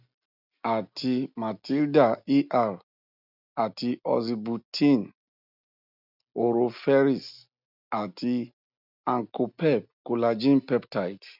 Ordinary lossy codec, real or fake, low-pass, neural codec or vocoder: none; real; 5.4 kHz; none